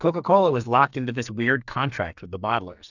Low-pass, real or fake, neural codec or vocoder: 7.2 kHz; fake; codec, 44.1 kHz, 2.6 kbps, SNAC